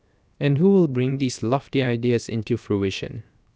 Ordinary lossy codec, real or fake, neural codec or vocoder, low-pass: none; fake; codec, 16 kHz, 0.7 kbps, FocalCodec; none